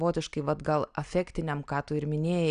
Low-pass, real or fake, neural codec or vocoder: 9.9 kHz; real; none